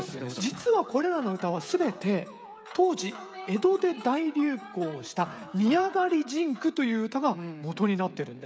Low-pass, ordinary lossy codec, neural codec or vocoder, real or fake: none; none; codec, 16 kHz, 16 kbps, FreqCodec, smaller model; fake